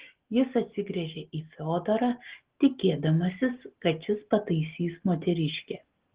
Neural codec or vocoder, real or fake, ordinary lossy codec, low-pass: none; real; Opus, 16 kbps; 3.6 kHz